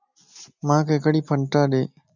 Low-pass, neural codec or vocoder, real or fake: 7.2 kHz; none; real